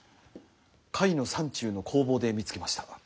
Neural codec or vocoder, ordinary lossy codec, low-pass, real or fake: none; none; none; real